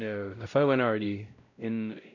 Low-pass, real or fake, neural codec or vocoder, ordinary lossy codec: 7.2 kHz; fake; codec, 16 kHz, 0.5 kbps, X-Codec, WavLM features, trained on Multilingual LibriSpeech; none